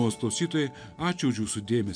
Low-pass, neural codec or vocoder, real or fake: 9.9 kHz; none; real